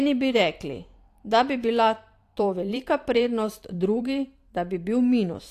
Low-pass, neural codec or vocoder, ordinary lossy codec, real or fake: 14.4 kHz; vocoder, 44.1 kHz, 128 mel bands every 512 samples, BigVGAN v2; AAC, 64 kbps; fake